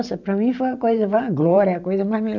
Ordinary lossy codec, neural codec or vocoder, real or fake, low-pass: none; vocoder, 44.1 kHz, 128 mel bands every 512 samples, BigVGAN v2; fake; 7.2 kHz